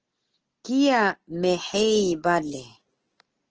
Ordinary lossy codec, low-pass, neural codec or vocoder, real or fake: Opus, 16 kbps; 7.2 kHz; none; real